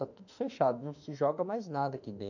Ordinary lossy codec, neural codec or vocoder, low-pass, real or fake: none; autoencoder, 48 kHz, 32 numbers a frame, DAC-VAE, trained on Japanese speech; 7.2 kHz; fake